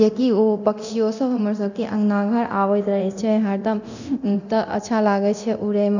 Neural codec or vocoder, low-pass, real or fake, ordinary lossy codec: codec, 24 kHz, 0.9 kbps, DualCodec; 7.2 kHz; fake; none